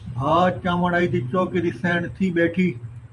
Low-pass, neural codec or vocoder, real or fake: 10.8 kHz; vocoder, 44.1 kHz, 128 mel bands every 512 samples, BigVGAN v2; fake